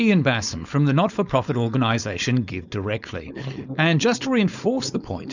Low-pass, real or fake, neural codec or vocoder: 7.2 kHz; fake; codec, 16 kHz, 4.8 kbps, FACodec